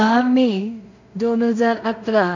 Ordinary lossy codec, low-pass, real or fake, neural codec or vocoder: none; 7.2 kHz; fake; codec, 16 kHz in and 24 kHz out, 0.4 kbps, LongCat-Audio-Codec, two codebook decoder